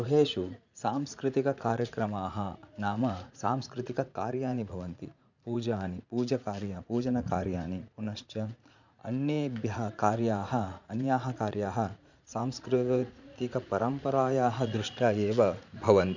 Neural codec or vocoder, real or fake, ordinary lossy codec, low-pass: vocoder, 44.1 kHz, 80 mel bands, Vocos; fake; none; 7.2 kHz